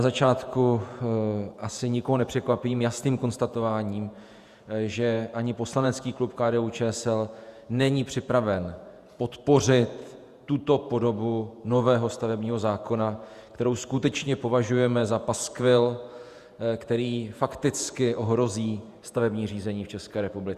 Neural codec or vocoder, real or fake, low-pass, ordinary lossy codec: vocoder, 48 kHz, 128 mel bands, Vocos; fake; 14.4 kHz; Opus, 64 kbps